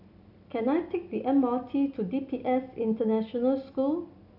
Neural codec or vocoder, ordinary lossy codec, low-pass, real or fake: none; none; 5.4 kHz; real